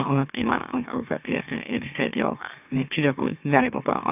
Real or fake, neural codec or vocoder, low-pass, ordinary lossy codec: fake; autoencoder, 44.1 kHz, a latent of 192 numbers a frame, MeloTTS; 3.6 kHz; none